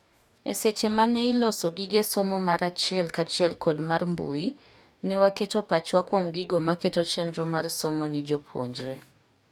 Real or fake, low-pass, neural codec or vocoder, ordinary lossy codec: fake; 19.8 kHz; codec, 44.1 kHz, 2.6 kbps, DAC; none